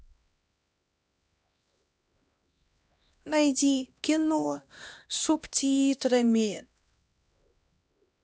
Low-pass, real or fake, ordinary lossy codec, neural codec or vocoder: none; fake; none; codec, 16 kHz, 1 kbps, X-Codec, HuBERT features, trained on LibriSpeech